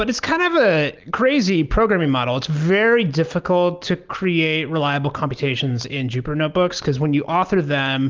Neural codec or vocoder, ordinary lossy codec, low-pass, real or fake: none; Opus, 32 kbps; 7.2 kHz; real